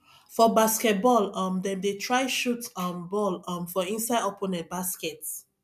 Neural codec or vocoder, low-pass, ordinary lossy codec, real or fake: none; 14.4 kHz; none; real